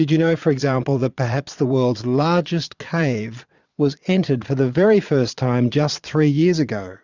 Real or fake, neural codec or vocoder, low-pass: fake; vocoder, 22.05 kHz, 80 mel bands, Vocos; 7.2 kHz